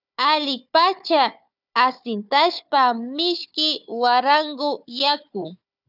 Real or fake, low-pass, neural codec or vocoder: fake; 5.4 kHz; codec, 16 kHz, 4 kbps, FunCodec, trained on Chinese and English, 50 frames a second